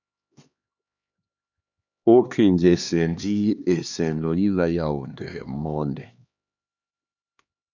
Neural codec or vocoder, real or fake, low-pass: codec, 16 kHz, 2 kbps, X-Codec, HuBERT features, trained on LibriSpeech; fake; 7.2 kHz